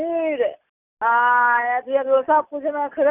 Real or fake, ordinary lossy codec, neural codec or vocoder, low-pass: real; none; none; 3.6 kHz